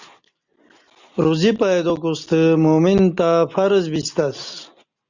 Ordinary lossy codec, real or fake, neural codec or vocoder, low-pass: Opus, 64 kbps; real; none; 7.2 kHz